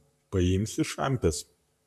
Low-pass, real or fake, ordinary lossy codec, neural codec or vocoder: 14.4 kHz; fake; AAC, 96 kbps; vocoder, 44.1 kHz, 128 mel bands, Pupu-Vocoder